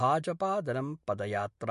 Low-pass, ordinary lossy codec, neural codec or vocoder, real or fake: 14.4 kHz; MP3, 48 kbps; none; real